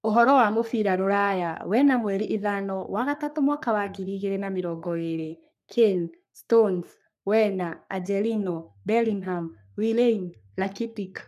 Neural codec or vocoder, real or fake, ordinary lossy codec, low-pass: codec, 44.1 kHz, 3.4 kbps, Pupu-Codec; fake; none; 14.4 kHz